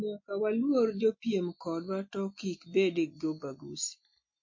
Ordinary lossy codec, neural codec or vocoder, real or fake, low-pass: MP3, 32 kbps; none; real; 7.2 kHz